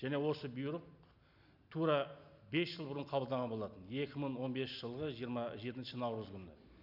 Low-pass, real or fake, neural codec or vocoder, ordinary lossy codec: 5.4 kHz; real; none; none